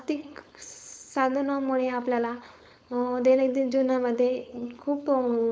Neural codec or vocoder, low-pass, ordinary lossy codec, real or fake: codec, 16 kHz, 4.8 kbps, FACodec; none; none; fake